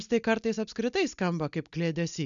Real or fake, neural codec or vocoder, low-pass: real; none; 7.2 kHz